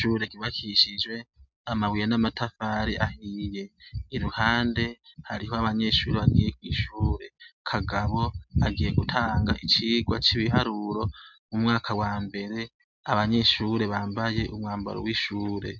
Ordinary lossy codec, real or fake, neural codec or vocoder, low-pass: MP3, 64 kbps; real; none; 7.2 kHz